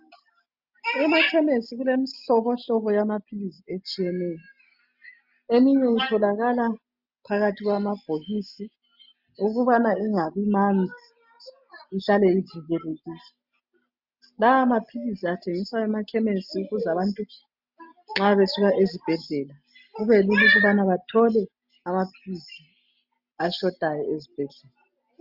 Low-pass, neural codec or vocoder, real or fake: 5.4 kHz; none; real